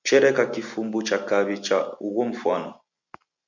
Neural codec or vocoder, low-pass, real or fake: none; 7.2 kHz; real